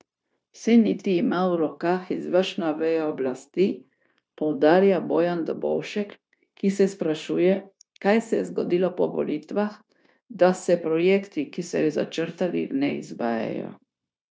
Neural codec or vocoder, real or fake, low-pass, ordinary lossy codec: codec, 16 kHz, 0.9 kbps, LongCat-Audio-Codec; fake; none; none